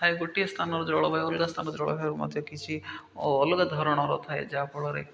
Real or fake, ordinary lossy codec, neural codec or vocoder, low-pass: real; none; none; none